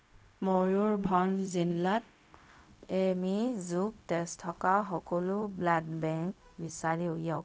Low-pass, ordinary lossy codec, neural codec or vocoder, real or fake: none; none; codec, 16 kHz, 0.4 kbps, LongCat-Audio-Codec; fake